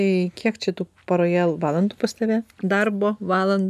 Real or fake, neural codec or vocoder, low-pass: real; none; 14.4 kHz